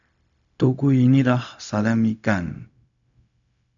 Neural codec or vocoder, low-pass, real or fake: codec, 16 kHz, 0.4 kbps, LongCat-Audio-Codec; 7.2 kHz; fake